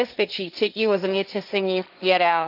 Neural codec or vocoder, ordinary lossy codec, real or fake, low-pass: codec, 16 kHz, 1.1 kbps, Voila-Tokenizer; none; fake; 5.4 kHz